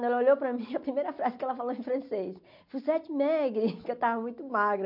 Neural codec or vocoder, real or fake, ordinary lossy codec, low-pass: none; real; MP3, 48 kbps; 5.4 kHz